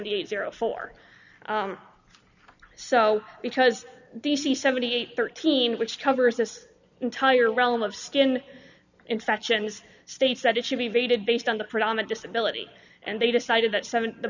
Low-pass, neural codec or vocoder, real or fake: 7.2 kHz; none; real